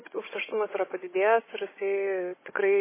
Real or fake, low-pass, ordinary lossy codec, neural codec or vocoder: fake; 3.6 kHz; MP3, 16 kbps; codec, 16 kHz, 16 kbps, FunCodec, trained on Chinese and English, 50 frames a second